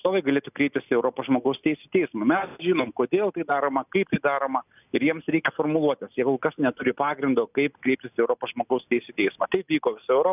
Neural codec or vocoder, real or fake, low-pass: none; real; 3.6 kHz